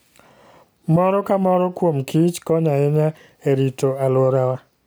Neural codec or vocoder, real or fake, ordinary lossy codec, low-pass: none; real; none; none